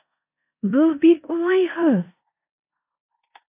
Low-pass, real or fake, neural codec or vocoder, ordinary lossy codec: 3.6 kHz; fake; codec, 16 kHz in and 24 kHz out, 0.9 kbps, LongCat-Audio-Codec, four codebook decoder; AAC, 24 kbps